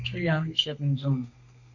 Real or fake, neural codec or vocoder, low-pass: fake; codec, 32 kHz, 1.9 kbps, SNAC; 7.2 kHz